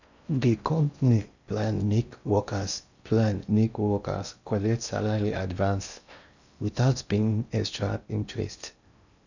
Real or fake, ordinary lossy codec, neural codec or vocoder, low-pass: fake; none; codec, 16 kHz in and 24 kHz out, 0.6 kbps, FocalCodec, streaming, 4096 codes; 7.2 kHz